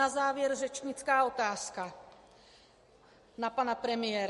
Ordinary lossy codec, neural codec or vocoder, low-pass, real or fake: MP3, 48 kbps; none; 14.4 kHz; real